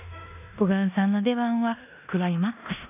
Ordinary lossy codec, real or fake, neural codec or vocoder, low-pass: none; fake; codec, 16 kHz in and 24 kHz out, 0.9 kbps, LongCat-Audio-Codec, four codebook decoder; 3.6 kHz